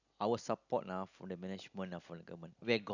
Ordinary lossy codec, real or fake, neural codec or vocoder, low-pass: none; real; none; 7.2 kHz